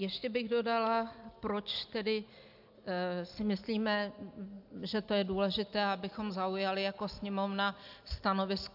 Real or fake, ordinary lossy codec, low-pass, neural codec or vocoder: real; AAC, 48 kbps; 5.4 kHz; none